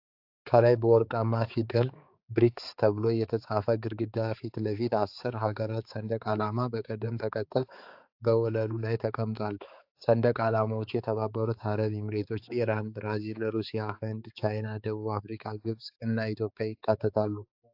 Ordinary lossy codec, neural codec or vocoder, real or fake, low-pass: AAC, 48 kbps; codec, 16 kHz, 4 kbps, X-Codec, HuBERT features, trained on balanced general audio; fake; 5.4 kHz